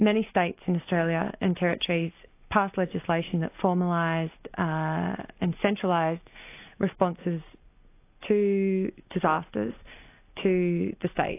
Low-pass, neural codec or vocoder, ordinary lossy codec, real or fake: 3.6 kHz; none; AAC, 24 kbps; real